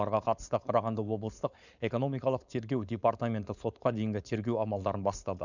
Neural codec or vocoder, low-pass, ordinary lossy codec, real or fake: codec, 16 kHz, 4.8 kbps, FACodec; 7.2 kHz; none; fake